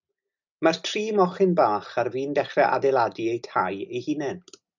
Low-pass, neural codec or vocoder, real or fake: 7.2 kHz; none; real